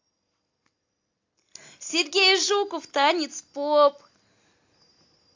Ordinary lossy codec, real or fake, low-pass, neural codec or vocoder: AAC, 48 kbps; real; 7.2 kHz; none